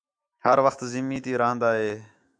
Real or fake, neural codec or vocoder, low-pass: fake; autoencoder, 48 kHz, 128 numbers a frame, DAC-VAE, trained on Japanese speech; 9.9 kHz